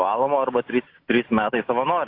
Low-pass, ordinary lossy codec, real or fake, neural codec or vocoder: 5.4 kHz; AAC, 32 kbps; real; none